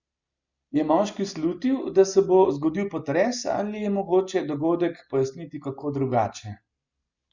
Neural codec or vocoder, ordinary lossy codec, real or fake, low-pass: none; Opus, 64 kbps; real; 7.2 kHz